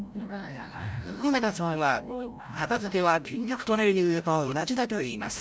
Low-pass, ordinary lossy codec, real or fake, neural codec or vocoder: none; none; fake; codec, 16 kHz, 0.5 kbps, FreqCodec, larger model